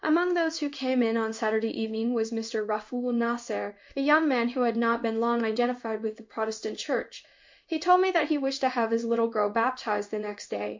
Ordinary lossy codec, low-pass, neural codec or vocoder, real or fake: MP3, 48 kbps; 7.2 kHz; codec, 16 kHz in and 24 kHz out, 1 kbps, XY-Tokenizer; fake